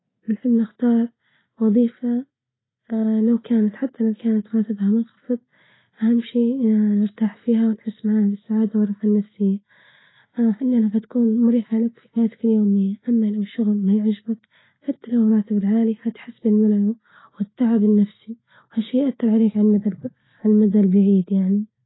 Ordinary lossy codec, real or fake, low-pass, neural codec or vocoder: AAC, 16 kbps; real; 7.2 kHz; none